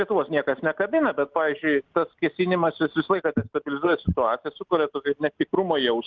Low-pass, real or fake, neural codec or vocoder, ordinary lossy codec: 7.2 kHz; real; none; Opus, 24 kbps